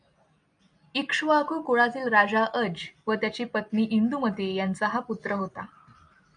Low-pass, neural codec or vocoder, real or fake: 9.9 kHz; none; real